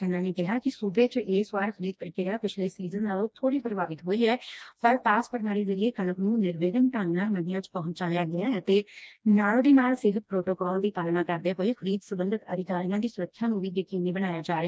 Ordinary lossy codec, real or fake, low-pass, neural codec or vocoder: none; fake; none; codec, 16 kHz, 1 kbps, FreqCodec, smaller model